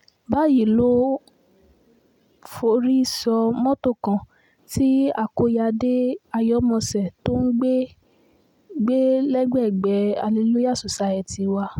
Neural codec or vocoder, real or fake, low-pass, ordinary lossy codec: none; real; 19.8 kHz; none